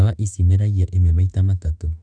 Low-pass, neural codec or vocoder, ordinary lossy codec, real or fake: 9.9 kHz; autoencoder, 48 kHz, 32 numbers a frame, DAC-VAE, trained on Japanese speech; none; fake